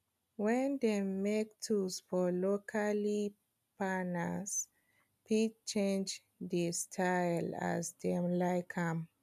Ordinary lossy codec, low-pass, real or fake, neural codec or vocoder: none; 14.4 kHz; real; none